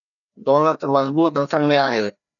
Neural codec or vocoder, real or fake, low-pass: codec, 16 kHz, 1 kbps, FreqCodec, larger model; fake; 7.2 kHz